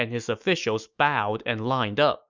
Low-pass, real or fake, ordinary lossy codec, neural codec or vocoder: 7.2 kHz; fake; Opus, 64 kbps; autoencoder, 48 kHz, 128 numbers a frame, DAC-VAE, trained on Japanese speech